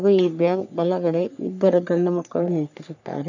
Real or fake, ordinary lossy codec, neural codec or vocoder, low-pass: fake; none; codec, 44.1 kHz, 3.4 kbps, Pupu-Codec; 7.2 kHz